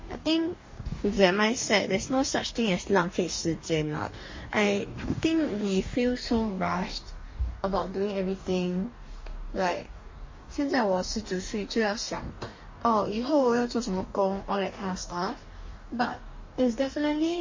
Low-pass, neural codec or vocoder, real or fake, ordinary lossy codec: 7.2 kHz; codec, 44.1 kHz, 2.6 kbps, DAC; fake; MP3, 32 kbps